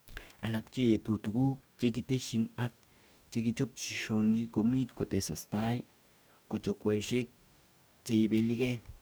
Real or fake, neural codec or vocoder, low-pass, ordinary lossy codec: fake; codec, 44.1 kHz, 2.6 kbps, DAC; none; none